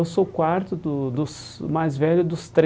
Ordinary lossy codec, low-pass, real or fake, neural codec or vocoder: none; none; real; none